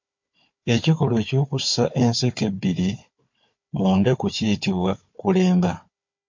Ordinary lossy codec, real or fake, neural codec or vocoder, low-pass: MP3, 48 kbps; fake; codec, 16 kHz, 4 kbps, FunCodec, trained on Chinese and English, 50 frames a second; 7.2 kHz